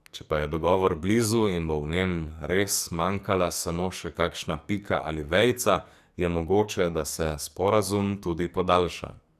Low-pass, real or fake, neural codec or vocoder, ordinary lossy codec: 14.4 kHz; fake; codec, 44.1 kHz, 2.6 kbps, SNAC; none